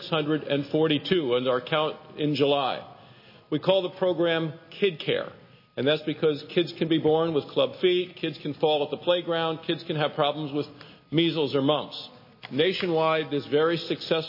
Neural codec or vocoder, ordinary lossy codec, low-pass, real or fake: none; MP3, 24 kbps; 5.4 kHz; real